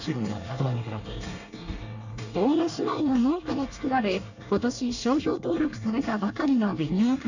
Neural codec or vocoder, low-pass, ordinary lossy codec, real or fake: codec, 24 kHz, 1 kbps, SNAC; 7.2 kHz; none; fake